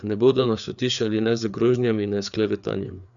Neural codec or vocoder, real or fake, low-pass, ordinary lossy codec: codec, 16 kHz, 4 kbps, FreqCodec, larger model; fake; 7.2 kHz; none